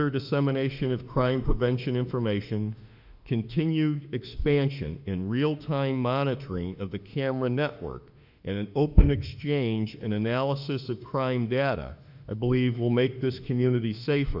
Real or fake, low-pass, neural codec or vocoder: fake; 5.4 kHz; autoencoder, 48 kHz, 32 numbers a frame, DAC-VAE, trained on Japanese speech